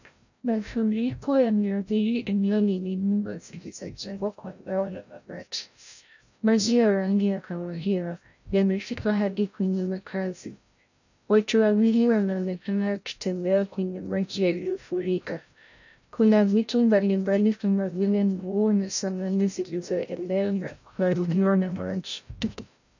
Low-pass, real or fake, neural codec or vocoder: 7.2 kHz; fake; codec, 16 kHz, 0.5 kbps, FreqCodec, larger model